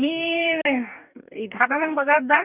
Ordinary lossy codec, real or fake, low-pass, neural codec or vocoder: none; fake; 3.6 kHz; codec, 44.1 kHz, 2.6 kbps, DAC